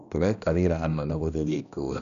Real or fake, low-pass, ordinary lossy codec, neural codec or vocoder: fake; 7.2 kHz; none; codec, 16 kHz, 1 kbps, X-Codec, HuBERT features, trained on balanced general audio